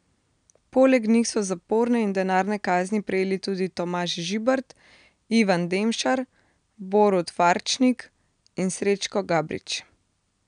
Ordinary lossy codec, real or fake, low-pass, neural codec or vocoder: none; real; 9.9 kHz; none